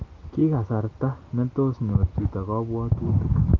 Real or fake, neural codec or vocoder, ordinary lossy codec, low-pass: real; none; Opus, 24 kbps; 7.2 kHz